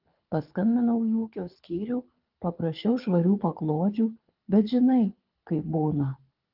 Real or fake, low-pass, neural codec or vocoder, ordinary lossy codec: fake; 5.4 kHz; codec, 24 kHz, 6 kbps, HILCodec; Opus, 24 kbps